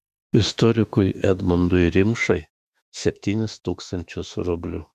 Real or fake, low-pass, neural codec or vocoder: fake; 14.4 kHz; autoencoder, 48 kHz, 32 numbers a frame, DAC-VAE, trained on Japanese speech